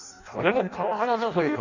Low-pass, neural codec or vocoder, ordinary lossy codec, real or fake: 7.2 kHz; codec, 16 kHz in and 24 kHz out, 0.6 kbps, FireRedTTS-2 codec; none; fake